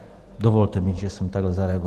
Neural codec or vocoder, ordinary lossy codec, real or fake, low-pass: none; Opus, 16 kbps; real; 14.4 kHz